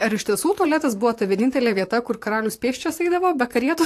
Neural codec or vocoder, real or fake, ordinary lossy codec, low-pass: vocoder, 44.1 kHz, 128 mel bands, Pupu-Vocoder; fake; AAC, 64 kbps; 14.4 kHz